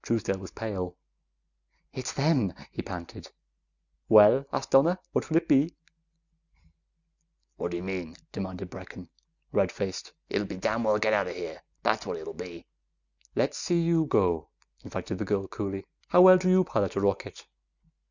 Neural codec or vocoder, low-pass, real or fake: none; 7.2 kHz; real